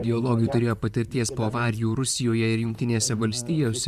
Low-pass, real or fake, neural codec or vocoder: 14.4 kHz; fake; vocoder, 44.1 kHz, 128 mel bands, Pupu-Vocoder